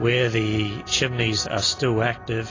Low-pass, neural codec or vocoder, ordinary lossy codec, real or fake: 7.2 kHz; none; AAC, 32 kbps; real